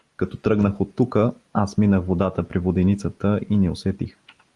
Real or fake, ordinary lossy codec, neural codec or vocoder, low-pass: real; Opus, 24 kbps; none; 10.8 kHz